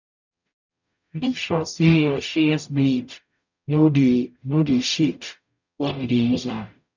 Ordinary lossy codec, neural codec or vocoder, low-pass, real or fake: none; codec, 44.1 kHz, 0.9 kbps, DAC; 7.2 kHz; fake